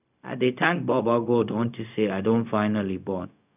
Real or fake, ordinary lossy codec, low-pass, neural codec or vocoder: fake; none; 3.6 kHz; codec, 16 kHz, 0.4 kbps, LongCat-Audio-Codec